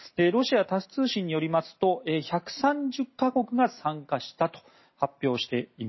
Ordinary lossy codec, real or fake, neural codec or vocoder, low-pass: MP3, 24 kbps; real; none; 7.2 kHz